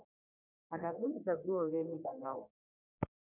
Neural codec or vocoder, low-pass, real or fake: codec, 44.1 kHz, 1.7 kbps, Pupu-Codec; 3.6 kHz; fake